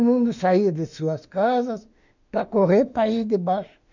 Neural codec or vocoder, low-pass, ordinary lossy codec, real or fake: autoencoder, 48 kHz, 32 numbers a frame, DAC-VAE, trained on Japanese speech; 7.2 kHz; none; fake